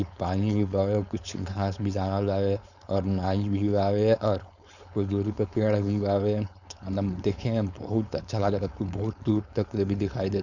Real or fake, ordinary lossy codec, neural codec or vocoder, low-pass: fake; none; codec, 16 kHz, 4.8 kbps, FACodec; 7.2 kHz